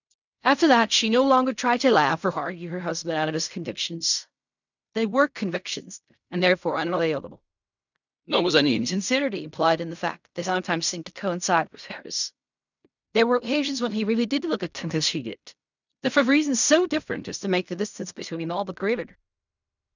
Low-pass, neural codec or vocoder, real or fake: 7.2 kHz; codec, 16 kHz in and 24 kHz out, 0.4 kbps, LongCat-Audio-Codec, fine tuned four codebook decoder; fake